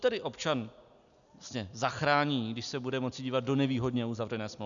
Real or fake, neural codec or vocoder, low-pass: real; none; 7.2 kHz